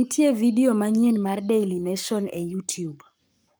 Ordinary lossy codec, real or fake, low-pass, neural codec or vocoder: none; fake; none; codec, 44.1 kHz, 7.8 kbps, Pupu-Codec